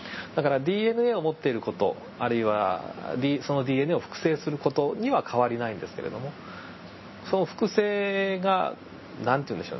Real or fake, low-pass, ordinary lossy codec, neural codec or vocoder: real; 7.2 kHz; MP3, 24 kbps; none